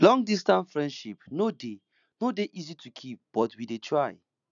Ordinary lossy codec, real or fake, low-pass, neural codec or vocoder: none; real; 7.2 kHz; none